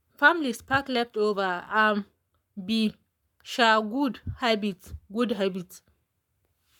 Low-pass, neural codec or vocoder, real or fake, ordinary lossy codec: 19.8 kHz; codec, 44.1 kHz, 7.8 kbps, Pupu-Codec; fake; none